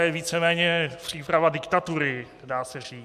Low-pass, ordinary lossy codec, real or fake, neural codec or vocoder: 14.4 kHz; MP3, 96 kbps; real; none